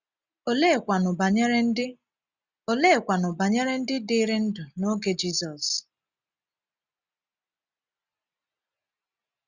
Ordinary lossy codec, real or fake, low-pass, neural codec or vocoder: Opus, 64 kbps; real; 7.2 kHz; none